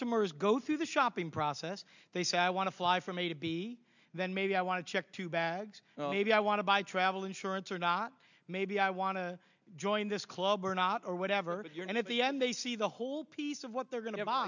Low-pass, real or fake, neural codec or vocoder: 7.2 kHz; real; none